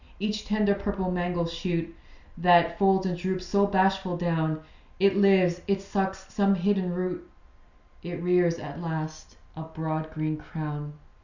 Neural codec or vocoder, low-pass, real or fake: none; 7.2 kHz; real